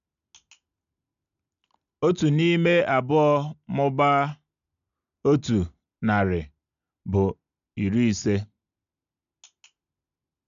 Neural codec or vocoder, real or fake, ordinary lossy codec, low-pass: none; real; MP3, 96 kbps; 7.2 kHz